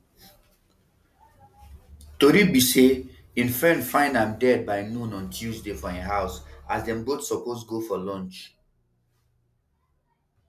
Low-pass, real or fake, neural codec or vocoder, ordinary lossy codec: 14.4 kHz; real; none; none